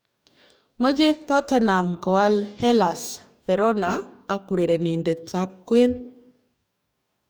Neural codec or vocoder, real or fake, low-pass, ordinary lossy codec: codec, 44.1 kHz, 2.6 kbps, DAC; fake; none; none